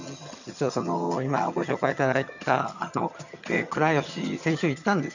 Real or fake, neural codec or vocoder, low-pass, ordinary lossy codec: fake; vocoder, 22.05 kHz, 80 mel bands, HiFi-GAN; 7.2 kHz; none